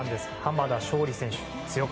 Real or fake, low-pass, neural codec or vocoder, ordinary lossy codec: real; none; none; none